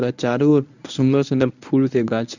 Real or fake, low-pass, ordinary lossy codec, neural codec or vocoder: fake; 7.2 kHz; none; codec, 24 kHz, 0.9 kbps, WavTokenizer, medium speech release version 1